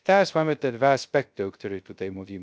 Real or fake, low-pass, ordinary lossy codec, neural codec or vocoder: fake; none; none; codec, 16 kHz, 0.3 kbps, FocalCodec